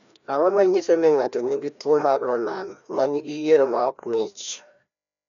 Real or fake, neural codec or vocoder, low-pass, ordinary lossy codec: fake; codec, 16 kHz, 1 kbps, FreqCodec, larger model; 7.2 kHz; none